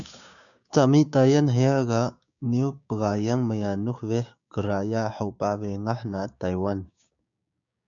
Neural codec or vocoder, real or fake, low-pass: codec, 16 kHz, 6 kbps, DAC; fake; 7.2 kHz